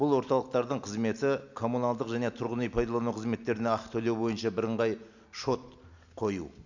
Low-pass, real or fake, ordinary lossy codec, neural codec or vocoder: 7.2 kHz; real; none; none